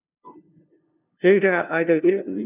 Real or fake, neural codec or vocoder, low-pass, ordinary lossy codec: fake; codec, 16 kHz, 0.5 kbps, FunCodec, trained on LibriTTS, 25 frames a second; 3.6 kHz; none